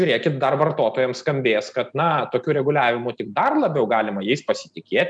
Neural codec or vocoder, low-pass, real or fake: none; 9.9 kHz; real